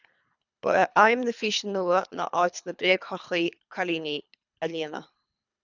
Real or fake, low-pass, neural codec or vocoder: fake; 7.2 kHz; codec, 24 kHz, 3 kbps, HILCodec